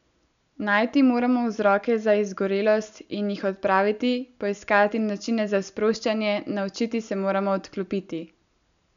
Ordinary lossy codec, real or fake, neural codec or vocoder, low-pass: none; real; none; 7.2 kHz